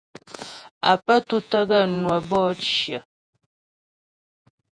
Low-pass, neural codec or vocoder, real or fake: 9.9 kHz; vocoder, 48 kHz, 128 mel bands, Vocos; fake